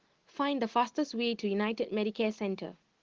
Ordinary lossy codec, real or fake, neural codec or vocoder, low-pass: Opus, 16 kbps; real; none; 7.2 kHz